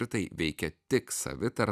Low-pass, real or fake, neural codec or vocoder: 14.4 kHz; fake; vocoder, 44.1 kHz, 128 mel bands every 256 samples, BigVGAN v2